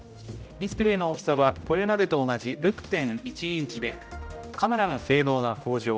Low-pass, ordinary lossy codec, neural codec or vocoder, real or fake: none; none; codec, 16 kHz, 0.5 kbps, X-Codec, HuBERT features, trained on general audio; fake